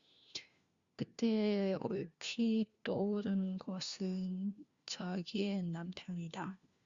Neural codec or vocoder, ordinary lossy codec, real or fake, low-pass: codec, 16 kHz, 1 kbps, FunCodec, trained on LibriTTS, 50 frames a second; Opus, 64 kbps; fake; 7.2 kHz